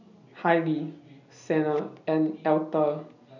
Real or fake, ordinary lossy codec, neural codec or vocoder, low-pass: real; none; none; 7.2 kHz